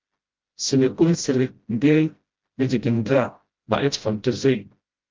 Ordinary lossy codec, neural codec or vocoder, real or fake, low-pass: Opus, 32 kbps; codec, 16 kHz, 0.5 kbps, FreqCodec, smaller model; fake; 7.2 kHz